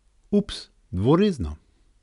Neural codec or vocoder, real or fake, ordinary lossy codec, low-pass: none; real; none; 10.8 kHz